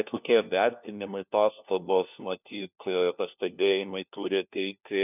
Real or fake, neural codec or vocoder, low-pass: fake; codec, 16 kHz, 1 kbps, FunCodec, trained on LibriTTS, 50 frames a second; 3.6 kHz